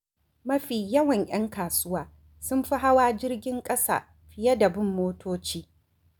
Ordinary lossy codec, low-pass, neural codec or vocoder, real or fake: none; none; none; real